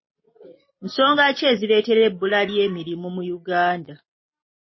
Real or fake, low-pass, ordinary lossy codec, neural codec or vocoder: real; 7.2 kHz; MP3, 24 kbps; none